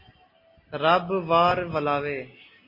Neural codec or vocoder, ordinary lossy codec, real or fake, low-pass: none; MP3, 24 kbps; real; 5.4 kHz